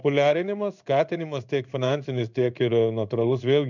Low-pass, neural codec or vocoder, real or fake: 7.2 kHz; codec, 16 kHz in and 24 kHz out, 1 kbps, XY-Tokenizer; fake